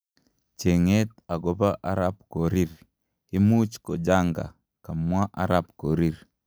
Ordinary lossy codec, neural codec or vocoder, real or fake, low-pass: none; none; real; none